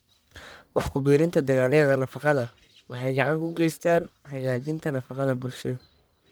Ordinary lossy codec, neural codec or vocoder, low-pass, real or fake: none; codec, 44.1 kHz, 1.7 kbps, Pupu-Codec; none; fake